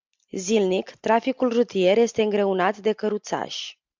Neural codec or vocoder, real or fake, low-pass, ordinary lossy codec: none; real; 7.2 kHz; MP3, 64 kbps